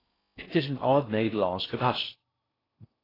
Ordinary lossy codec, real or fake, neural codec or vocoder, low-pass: AAC, 24 kbps; fake; codec, 16 kHz in and 24 kHz out, 0.6 kbps, FocalCodec, streaming, 4096 codes; 5.4 kHz